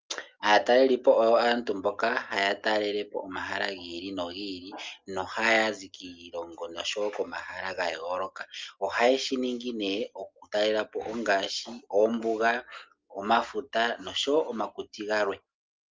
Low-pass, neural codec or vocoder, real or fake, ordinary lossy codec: 7.2 kHz; none; real; Opus, 32 kbps